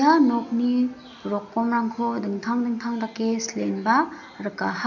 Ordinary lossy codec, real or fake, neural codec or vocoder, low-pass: none; real; none; 7.2 kHz